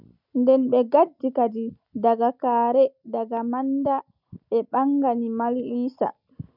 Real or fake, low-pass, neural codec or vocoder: real; 5.4 kHz; none